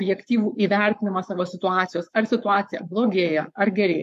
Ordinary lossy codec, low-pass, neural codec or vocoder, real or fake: MP3, 64 kbps; 14.4 kHz; codec, 44.1 kHz, 7.8 kbps, Pupu-Codec; fake